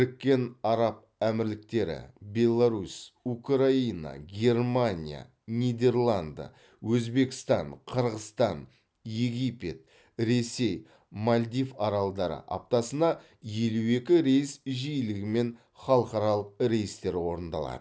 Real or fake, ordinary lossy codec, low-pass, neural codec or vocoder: real; none; none; none